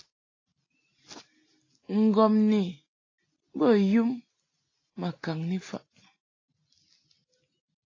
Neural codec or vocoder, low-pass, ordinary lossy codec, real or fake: none; 7.2 kHz; AAC, 32 kbps; real